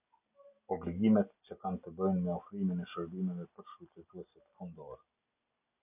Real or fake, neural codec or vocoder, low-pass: real; none; 3.6 kHz